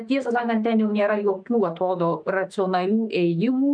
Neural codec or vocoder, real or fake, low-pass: autoencoder, 48 kHz, 32 numbers a frame, DAC-VAE, trained on Japanese speech; fake; 9.9 kHz